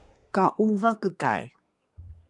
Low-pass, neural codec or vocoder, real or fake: 10.8 kHz; codec, 24 kHz, 1 kbps, SNAC; fake